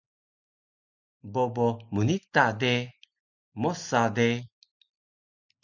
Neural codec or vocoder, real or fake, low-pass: none; real; 7.2 kHz